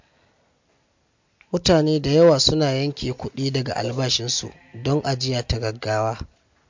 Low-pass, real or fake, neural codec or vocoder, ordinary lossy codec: 7.2 kHz; real; none; MP3, 48 kbps